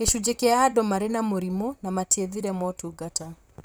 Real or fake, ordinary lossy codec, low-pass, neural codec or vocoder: fake; none; none; vocoder, 44.1 kHz, 128 mel bands, Pupu-Vocoder